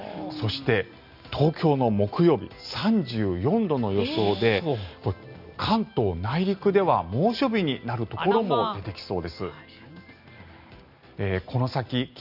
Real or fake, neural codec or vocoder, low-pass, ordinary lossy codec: real; none; 5.4 kHz; none